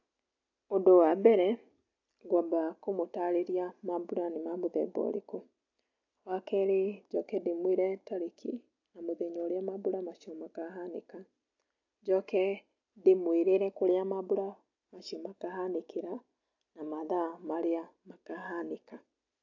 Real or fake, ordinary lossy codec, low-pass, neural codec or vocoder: real; AAC, 48 kbps; 7.2 kHz; none